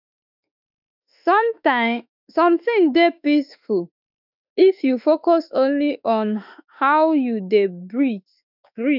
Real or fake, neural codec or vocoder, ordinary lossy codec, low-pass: fake; autoencoder, 48 kHz, 32 numbers a frame, DAC-VAE, trained on Japanese speech; none; 5.4 kHz